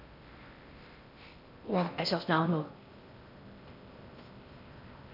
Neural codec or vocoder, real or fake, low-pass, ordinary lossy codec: codec, 16 kHz in and 24 kHz out, 0.6 kbps, FocalCodec, streaming, 4096 codes; fake; 5.4 kHz; none